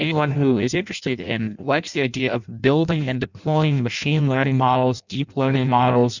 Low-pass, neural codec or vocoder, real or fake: 7.2 kHz; codec, 16 kHz in and 24 kHz out, 0.6 kbps, FireRedTTS-2 codec; fake